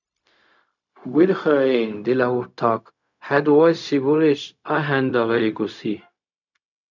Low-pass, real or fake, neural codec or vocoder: 7.2 kHz; fake; codec, 16 kHz, 0.4 kbps, LongCat-Audio-Codec